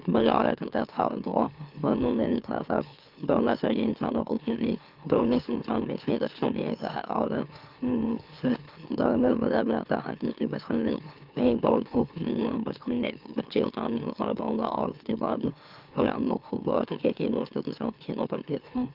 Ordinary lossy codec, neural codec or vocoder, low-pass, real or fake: Opus, 32 kbps; autoencoder, 44.1 kHz, a latent of 192 numbers a frame, MeloTTS; 5.4 kHz; fake